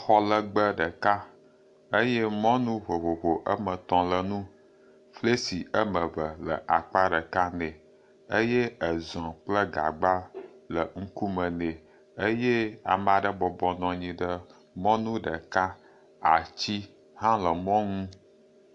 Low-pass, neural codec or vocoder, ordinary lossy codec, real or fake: 10.8 kHz; none; AAC, 64 kbps; real